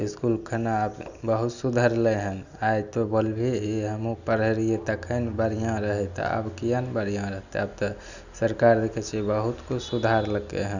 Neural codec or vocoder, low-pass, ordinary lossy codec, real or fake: none; 7.2 kHz; none; real